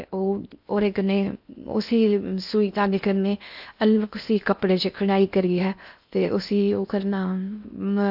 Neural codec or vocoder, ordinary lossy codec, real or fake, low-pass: codec, 16 kHz in and 24 kHz out, 0.6 kbps, FocalCodec, streaming, 4096 codes; AAC, 48 kbps; fake; 5.4 kHz